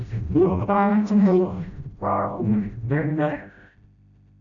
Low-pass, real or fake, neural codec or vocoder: 7.2 kHz; fake; codec, 16 kHz, 0.5 kbps, FreqCodec, smaller model